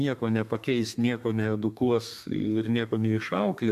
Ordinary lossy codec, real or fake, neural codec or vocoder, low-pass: AAC, 96 kbps; fake; codec, 32 kHz, 1.9 kbps, SNAC; 14.4 kHz